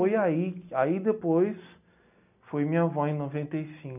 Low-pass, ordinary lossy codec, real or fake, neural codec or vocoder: 3.6 kHz; none; real; none